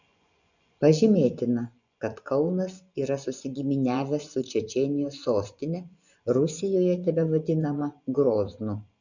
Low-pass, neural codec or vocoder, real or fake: 7.2 kHz; vocoder, 24 kHz, 100 mel bands, Vocos; fake